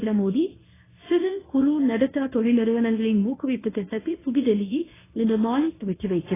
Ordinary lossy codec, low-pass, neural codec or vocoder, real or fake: AAC, 16 kbps; 3.6 kHz; codec, 24 kHz, 0.9 kbps, WavTokenizer, medium speech release version 1; fake